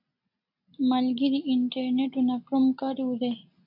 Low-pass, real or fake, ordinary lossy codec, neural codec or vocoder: 5.4 kHz; real; AAC, 32 kbps; none